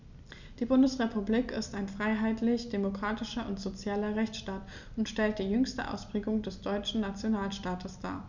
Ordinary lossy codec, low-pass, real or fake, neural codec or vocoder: none; 7.2 kHz; real; none